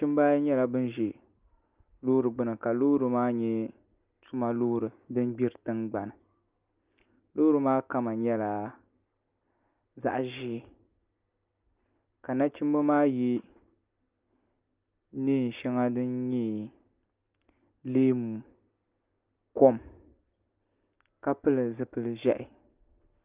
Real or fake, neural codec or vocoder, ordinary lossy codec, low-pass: real; none; Opus, 24 kbps; 3.6 kHz